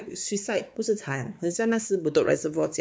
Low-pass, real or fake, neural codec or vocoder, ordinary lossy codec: none; fake; codec, 16 kHz, 4 kbps, X-Codec, WavLM features, trained on Multilingual LibriSpeech; none